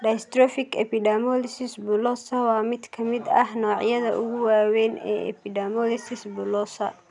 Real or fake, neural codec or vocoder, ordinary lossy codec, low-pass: real; none; none; 10.8 kHz